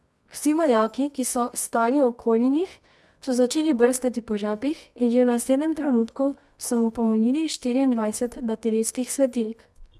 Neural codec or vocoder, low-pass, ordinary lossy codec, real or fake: codec, 24 kHz, 0.9 kbps, WavTokenizer, medium music audio release; none; none; fake